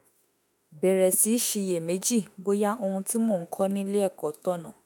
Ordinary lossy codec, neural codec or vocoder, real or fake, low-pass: none; autoencoder, 48 kHz, 32 numbers a frame, DAC-VAE, trained on Japanese speech; fake; none